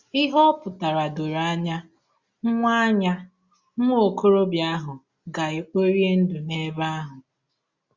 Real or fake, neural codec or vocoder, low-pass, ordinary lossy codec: real; none; 7.2 kHz; Opus, 64 kbps